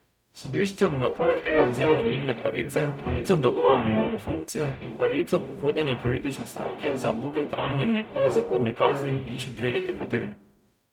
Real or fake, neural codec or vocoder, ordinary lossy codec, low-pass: fake; codec, 44.1 kHz, 0.9 kbps, DAC; none; 19.8 kHz